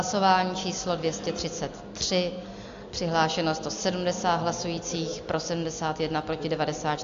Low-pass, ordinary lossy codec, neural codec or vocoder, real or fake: 7.2 kHz; AAC, 64 kbps; none; real